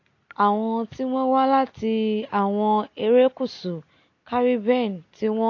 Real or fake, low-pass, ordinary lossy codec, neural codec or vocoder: real; 7.2 kHz; AAC, 48 kbps; none